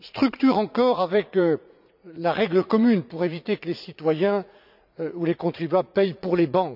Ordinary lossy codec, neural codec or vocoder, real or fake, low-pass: none; vocoder, 44.1 kHz, 80 mel bands, Vocos; fake; 5.4 kHz